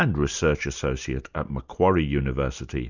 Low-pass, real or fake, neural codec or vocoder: 7.2 kHz; real; none